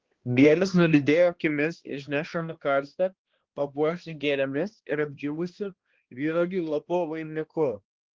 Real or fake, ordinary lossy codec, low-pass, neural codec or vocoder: fake; Opus, 16 kbps; 7.2 kHz; codec, 16 kHz, 1 kbps, X-Codec, HuBERT features, trained on balanced general audio